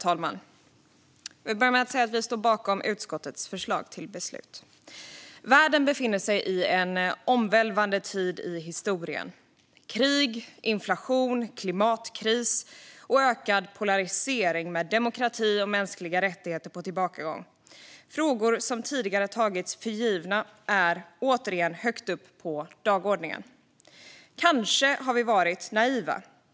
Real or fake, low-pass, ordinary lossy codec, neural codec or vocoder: real; none; none; none